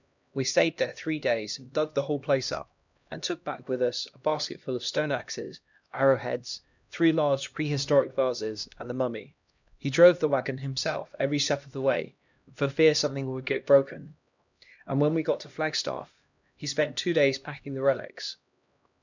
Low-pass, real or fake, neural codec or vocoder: 7.2 kHz; fake; codec, 16 kHz, 1 kbps, X-Codec, HuBERT features, trained on LibriSpeech